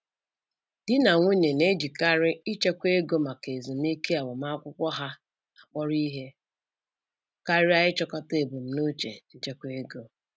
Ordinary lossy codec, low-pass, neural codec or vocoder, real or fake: none; none; none; real